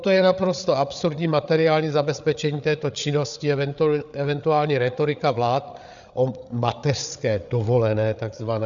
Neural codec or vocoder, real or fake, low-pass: codec, 16 kHz, 8 kbps, FreqCodec, larger model; fake; 7.2 kHz